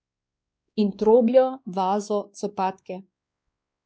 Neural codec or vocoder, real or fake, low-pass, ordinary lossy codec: codec, 16 kHz, 2 kbps, X-Codec, WavLM features, trained on Multilingual LibriSpeech; fake; none; none